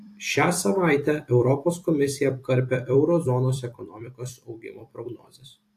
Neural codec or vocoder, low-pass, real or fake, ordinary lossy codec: vocoder, 44.1 kHz, 128 mel bands every 256 samples, BigVGAN v2; 14.4 kHz; fake; AAC, 64 kbps